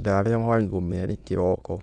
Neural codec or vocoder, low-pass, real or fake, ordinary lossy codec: autoencoder, 22.05 kHz, a latent of 192 numbers a frame, VITS, trained on many speakers; 9.9 kHz; fake; none